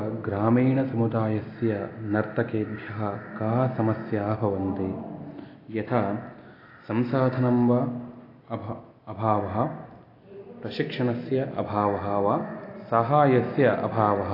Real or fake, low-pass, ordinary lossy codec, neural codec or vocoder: real; 5.4 kHz; AAC, 32 kbps; none